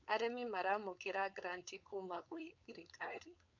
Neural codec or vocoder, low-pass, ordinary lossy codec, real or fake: codec, 16 kHz, 4.8 kbps, FACodec; 7.2 kHz; none; fake